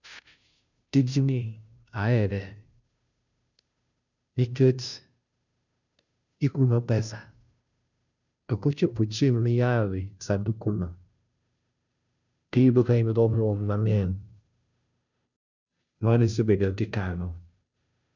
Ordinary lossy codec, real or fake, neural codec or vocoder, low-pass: none; fake; codec, 16 kHz, 0.5 kbps, FunCodec, trained on Chinese and English, 25 frames a second; 7.2 kHz